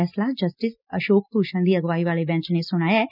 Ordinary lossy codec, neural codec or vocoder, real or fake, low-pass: none; none; real; 5.4 kHz